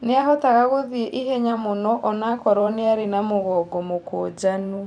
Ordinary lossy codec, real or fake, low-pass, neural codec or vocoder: none; fake; 9.9 kHz; vocoder, 44.1 kHz, 128 mel bands every 512 samples, BigVGAN v2